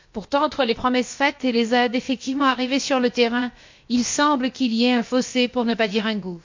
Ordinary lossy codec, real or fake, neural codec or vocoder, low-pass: MP3, 48 kbps; fake; codec, 16 kHz, about 1 kbps, DyCAST, with the encoder's durations; 7.2 kHz